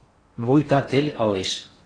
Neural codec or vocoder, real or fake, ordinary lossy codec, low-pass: codec, 16 kHz in and 24 kHz out, 0.6 kbps, FocalCodec, streaming, 4096 codes; fake; AAC, 32 kbps; 9.9 kHz